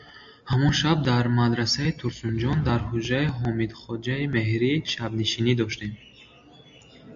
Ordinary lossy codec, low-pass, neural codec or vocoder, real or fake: AAC, 64 kbps; 7.2 kHz; none; real